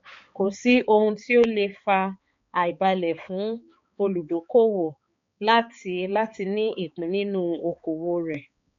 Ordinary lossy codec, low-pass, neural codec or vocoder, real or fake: MP3, 48 kbps; 7.2 kHz; codec, 16 kHz, 4 kbps, X-Codec, HuBERT features, trained on balanced general audio; fake